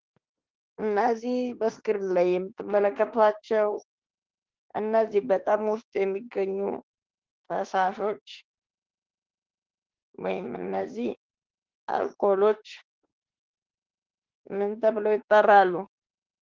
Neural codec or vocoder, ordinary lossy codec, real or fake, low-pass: autoencoder, 48 kHz, 32 numbers a frame, DAC-VAE, trained on Japanese speech; Opus, 16 kbps; fake; 7.2 kHz